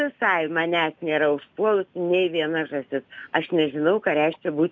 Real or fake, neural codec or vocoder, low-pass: real; none; 7.2 kHz